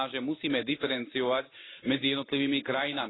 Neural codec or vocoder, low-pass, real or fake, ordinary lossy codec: none; 7.2 kHz; real; AAC, 16 kbps